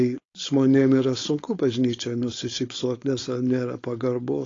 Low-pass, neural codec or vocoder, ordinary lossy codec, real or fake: 7.2 kHz; codec, 16 kHz, 4.8 kbps, FACodec; AAC, 32 kbps; fake